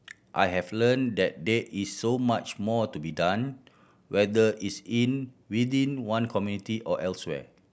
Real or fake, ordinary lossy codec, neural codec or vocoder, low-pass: real; none; none; none